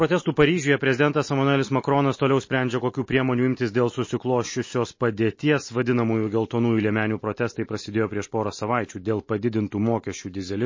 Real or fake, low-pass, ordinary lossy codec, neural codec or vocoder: real; 7.2 kHz; MP3, 32 kbps; none